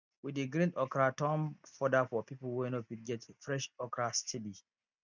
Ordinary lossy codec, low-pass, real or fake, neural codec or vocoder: none; 7.2 kHz; real; none